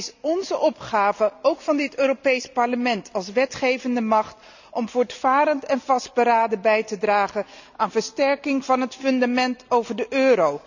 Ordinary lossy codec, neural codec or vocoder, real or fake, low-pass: none; none; real; 7.2 kHz